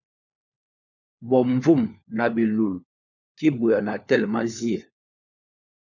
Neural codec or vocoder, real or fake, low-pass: codec, 16 kHz, 4 kbps, FunCodec, trained on LibriTTS, 50 frames a second; fake; 7.2 kHz